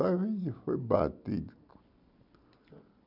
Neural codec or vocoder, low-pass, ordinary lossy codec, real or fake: none; 5.4 kHz; none; real